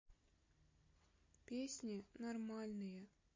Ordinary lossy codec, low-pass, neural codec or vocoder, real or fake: MP3, 32 kbps; 7.2 kHz; none; real